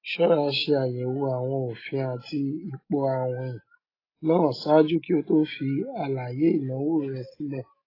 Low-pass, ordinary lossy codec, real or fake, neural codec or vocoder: 5.4 kHz; AAC, 24 kbps; real; none